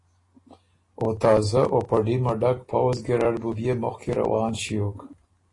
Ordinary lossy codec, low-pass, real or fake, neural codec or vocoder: AAC, 32 kbps; 10.8 kHz; real; none